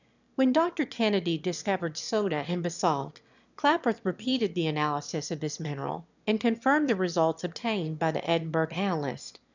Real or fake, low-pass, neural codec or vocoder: fake; 7.2 kHz; autoencoder, 22.05 kHz, a latent of 192 numbers a frame, VITS, trained on one speaker